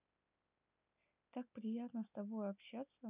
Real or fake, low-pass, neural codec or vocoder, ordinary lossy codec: real; 3.6 kHz; none; none